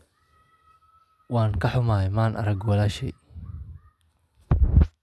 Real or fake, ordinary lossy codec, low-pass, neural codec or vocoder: real; none; none; none